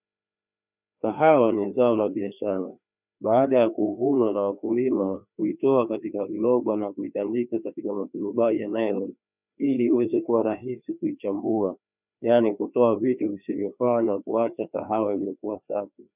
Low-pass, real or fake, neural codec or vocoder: 3.6 kHz; fake; codec, 16 kHz, 2 kbps, FreqCodec, larger model